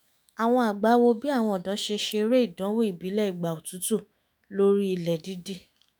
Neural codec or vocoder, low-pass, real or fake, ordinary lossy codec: autoencoder, 48 kHz, 128 numbers a frame, DAC-VAE, trained on Japanese speech; none; fake; none